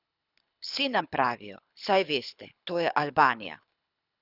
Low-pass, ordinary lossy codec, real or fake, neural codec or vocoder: 5.4 kHz; none; fake; codec, 24 kHz, 6 kbps, HILCodec